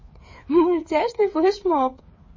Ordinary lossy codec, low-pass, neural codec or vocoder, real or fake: MP3, 32 kbps; 7.2 kHz; codec, 16 kHz, 8 kbps, FreqCodec, smaller model; fake